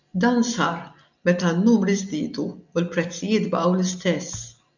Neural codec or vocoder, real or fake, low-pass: none; real; 7.2 kHz